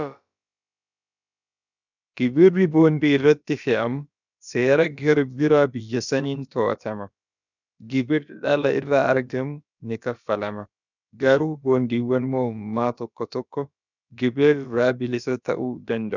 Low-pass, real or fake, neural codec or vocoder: 7.2 kHz; fake; codec, 16 kHz, about 1 kbps, DyCAST, with the encoder's durations